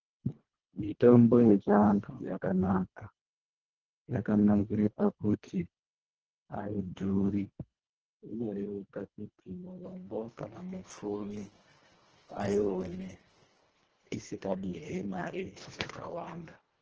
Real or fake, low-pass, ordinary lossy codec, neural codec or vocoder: fake; 7.2 kHz; Opus, 16 kbps; codec, 24 kHz, 1.5 kbps, HILCodec